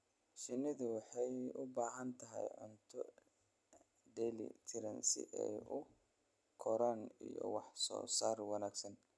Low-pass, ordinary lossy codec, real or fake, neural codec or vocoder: none; none; real; none